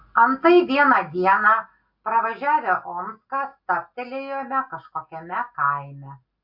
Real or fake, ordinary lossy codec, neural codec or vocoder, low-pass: real; AAC, 48 kbps; none; 5.4 kHz